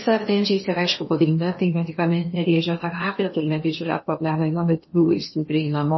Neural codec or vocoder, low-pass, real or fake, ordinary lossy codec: codec, 16 kHz in and 24 kHz out, 0.8 kbps, FocalCodec, streaming, 65536 codes; 7.2 kHz; fake; MP3, 24 kbps